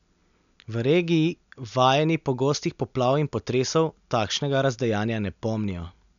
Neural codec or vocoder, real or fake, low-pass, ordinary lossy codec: none; real; 7.2 kHz; none